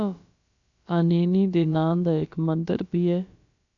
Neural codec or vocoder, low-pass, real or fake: codec, 16 kHz, about 1 kbps, DyCAST, with the encoder's durations; 7.2 kHz; fake